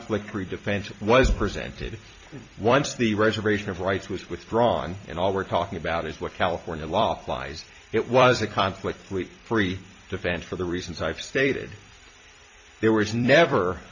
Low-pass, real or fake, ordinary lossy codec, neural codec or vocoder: 7.2 kHz; fake; MP3, 48 kbps; vocoder, 44.1 kHz, 128 mel bands every 256 samples, BigVGAN v2